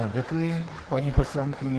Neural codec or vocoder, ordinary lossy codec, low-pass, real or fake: codec, 24 kHz, 1 kbps, SNAC; Opus, 16 kbps; 10.8 kHz; fake